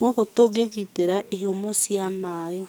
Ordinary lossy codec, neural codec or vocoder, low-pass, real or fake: none; codec, 44.1 kHz, 2.6 kbps, SNAC; none; fake